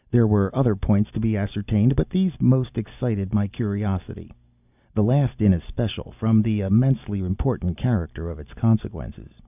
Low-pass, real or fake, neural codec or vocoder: 3.6 kHz; real; none